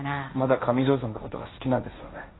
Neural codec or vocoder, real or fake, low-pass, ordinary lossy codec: codec, 16 kHz, 1.1 kbps, Voila-Tokenizer; fake; 7.2 kHz; AAC, 16 kbps